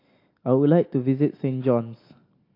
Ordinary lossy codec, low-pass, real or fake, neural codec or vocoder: AAC, 32 kbps; 5.4 kHz; real; none